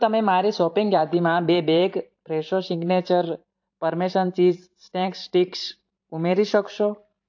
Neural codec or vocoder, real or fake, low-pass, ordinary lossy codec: vocoder, 44.1 kHz, 128 mel bands every 512 samples, BigVGAN v2; fake; 7.2 kHz; AAC, 48 kbps